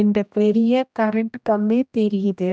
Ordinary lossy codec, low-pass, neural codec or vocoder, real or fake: none; none; codec, 16 kHz, 1 kbps, X-Codec, HuBERT features, trained on general audio; fake